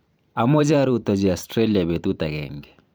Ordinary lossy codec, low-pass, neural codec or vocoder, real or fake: none; none; vocoder, 44.1 kHz, 128 mel bands every 512 samples, BigVGAN v2; fake